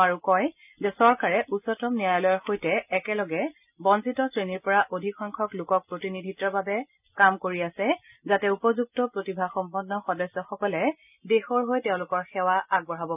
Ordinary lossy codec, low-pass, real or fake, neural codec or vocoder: none; 3.6 kHz; real; none